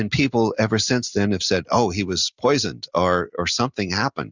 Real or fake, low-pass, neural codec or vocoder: real; 7.2 kHz; none